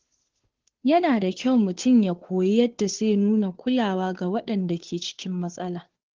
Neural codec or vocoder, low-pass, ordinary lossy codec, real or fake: codec, 16 kHz, 2 kbps, FunCodec, trained on Chinese and English, 25 frames a second; 7.2 kHz; Opus, 16 kbps; fake